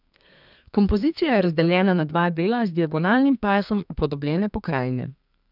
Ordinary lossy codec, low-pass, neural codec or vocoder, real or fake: none; 5.4 kHz; codec, 32 kHz, 1.9 kbps, SNAC; fake